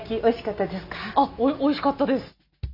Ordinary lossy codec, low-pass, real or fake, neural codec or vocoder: none; 5.4 kHz; real; none